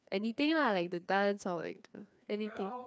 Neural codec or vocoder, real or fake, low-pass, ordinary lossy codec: codec, 16 kHz, 2 kbps, FreqCodec, larger model; fake; none; none